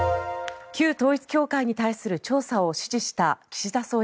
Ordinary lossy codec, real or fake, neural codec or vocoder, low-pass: none; real; none; none